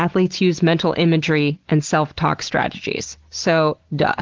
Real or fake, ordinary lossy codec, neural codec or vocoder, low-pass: real; Opus, 16 kbps; none; 7.2 kHz